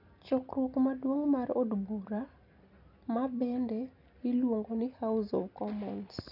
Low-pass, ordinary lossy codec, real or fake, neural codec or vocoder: 5.4 kHz; none; real; none